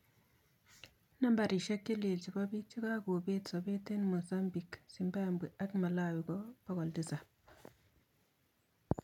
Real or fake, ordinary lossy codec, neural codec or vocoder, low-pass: real; none; none; 19.8 kHz